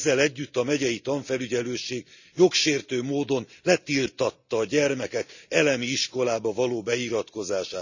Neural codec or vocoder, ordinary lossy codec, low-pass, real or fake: none; none; 7.2 kHz; real